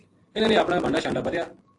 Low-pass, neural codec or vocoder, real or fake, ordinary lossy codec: 10.8 kHz; none; real; AAC, 48 kbps